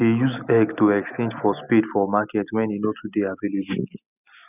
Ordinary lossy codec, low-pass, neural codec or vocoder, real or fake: none; 3.6 kHz; none; real